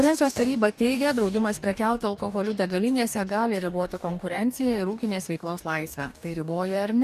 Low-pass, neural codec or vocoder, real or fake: 14.4 kHz; codec, 44.1 kHz, 2.6 kbps, DAC; fake